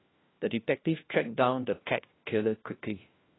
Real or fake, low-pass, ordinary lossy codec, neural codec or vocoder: fake; 7.2 kHz; AAC, 16 kbps; codec, 16 kHz, 1 kbps, FunCodec, trained on LibriTTS, 50 frames a second